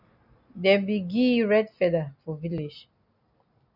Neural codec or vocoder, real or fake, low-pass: none; real; 5.4 kHz